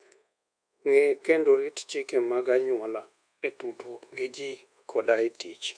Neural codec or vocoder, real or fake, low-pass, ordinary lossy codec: codec, 24 kHz, 1.2 kbps, DualCodec; fake; 9.9 kHz; none